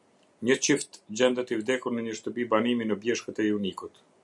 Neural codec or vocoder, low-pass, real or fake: none; 10.8 kHz; real